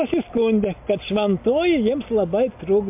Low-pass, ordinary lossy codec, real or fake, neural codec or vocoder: 3.6 kHz; MP3, 32 kbps; real; none